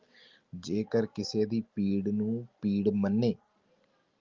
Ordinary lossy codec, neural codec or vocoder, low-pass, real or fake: Opus, 32 kbps; none; 7.2 kHz; real